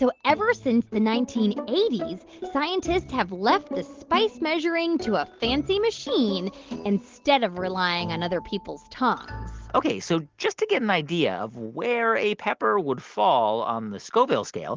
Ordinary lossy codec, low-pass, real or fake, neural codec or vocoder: Opus, 16 kbps; 7.2 kHz; real; none